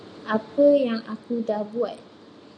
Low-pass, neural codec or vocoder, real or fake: 9.9 kHz; none; real